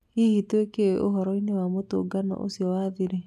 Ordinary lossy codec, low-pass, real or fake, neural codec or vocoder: none; 14.4 kHz; real; none